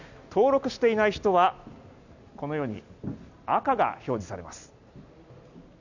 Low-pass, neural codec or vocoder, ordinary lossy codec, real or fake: 7.2 kHz; none; none; real